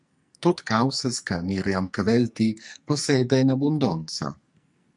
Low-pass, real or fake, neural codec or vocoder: 10.8 kHz; fake; codec, 44.1 kHz, 2.6 kbps, SNAC